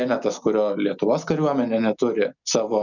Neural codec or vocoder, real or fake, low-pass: none; real; 7.2 kHz